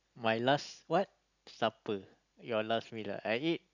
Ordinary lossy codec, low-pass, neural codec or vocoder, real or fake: none; 7.2 kHz; none; real